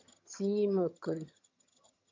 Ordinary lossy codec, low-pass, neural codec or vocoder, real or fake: MP3, 48 kbps; 7.2 kHz; vocoder, 22.05 kHz, 80 mel bands, HiFi-GAN; fake